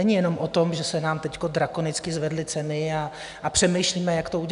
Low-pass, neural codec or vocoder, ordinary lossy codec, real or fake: 10.8 kHz; none; MP3, 96 kbps; real